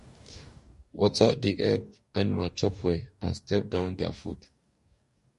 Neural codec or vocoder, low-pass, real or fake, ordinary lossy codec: codec, 44.1 kHz, 2.6 kbps, DAC; 14.4 kHz; fake; MP3, 48 kbps